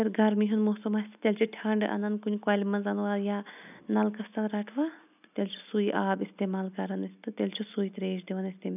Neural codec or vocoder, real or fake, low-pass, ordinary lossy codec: none; real; 3.6 kHz; none